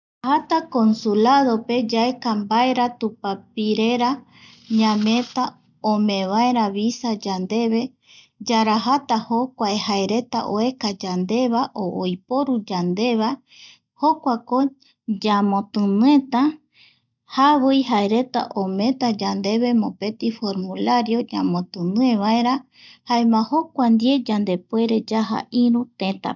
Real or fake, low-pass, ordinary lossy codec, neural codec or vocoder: real; 7.2 kHz; none; none